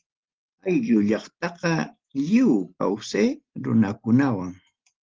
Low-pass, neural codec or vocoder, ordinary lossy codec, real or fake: 7.2 kHz; none; Opus, 16 kbps; real